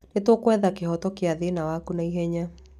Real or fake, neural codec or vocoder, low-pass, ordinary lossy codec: real; none; 14.4 kHz; none